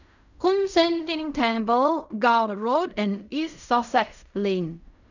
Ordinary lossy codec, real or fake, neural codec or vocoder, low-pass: none; fake; codec, 16 kHz in and 24 kHz out, 0.4 kbps, LongCat-Audio-Codec, fine tuned four codebook decoder; 7.2 kHz